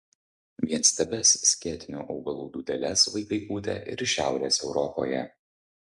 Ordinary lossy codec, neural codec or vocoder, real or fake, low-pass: MP3, 96 kbps; none; real; 10.8 kHz